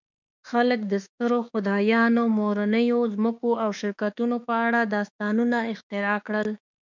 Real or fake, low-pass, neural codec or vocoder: fake; 7.2 kHz; autoencoder, 48 kHz, 32 numbers a frame, DAC-VAE, trained on Japanese speech